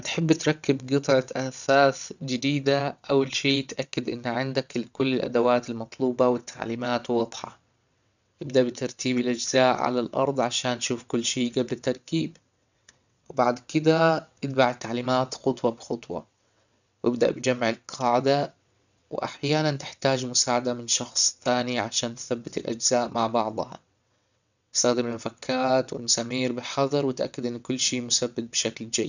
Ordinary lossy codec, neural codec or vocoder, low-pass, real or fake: none; vocoder, 22.05 kHz, 80 mel bands, WaveNeXt; 7.2 kHz; fake